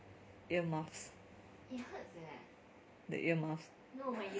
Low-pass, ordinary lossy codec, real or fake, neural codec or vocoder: none; none; real; none